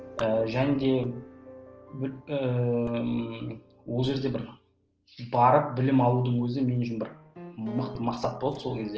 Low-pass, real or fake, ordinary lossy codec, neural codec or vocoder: 7.2 kHz; real; Opus, 16 kbps; none